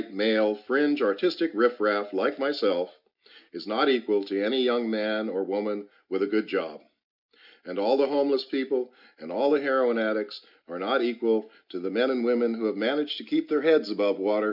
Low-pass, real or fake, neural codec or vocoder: 5.4 kHz; real; none